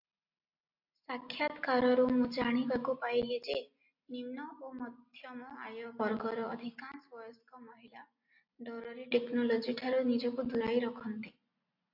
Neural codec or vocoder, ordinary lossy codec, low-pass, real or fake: none; AAC, 48 kbps; 5.4 kHz; real